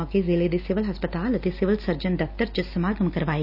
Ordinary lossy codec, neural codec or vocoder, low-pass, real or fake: none; none; 5.4 kHz; real